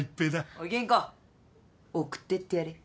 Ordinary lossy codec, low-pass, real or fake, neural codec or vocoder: none; none; real; none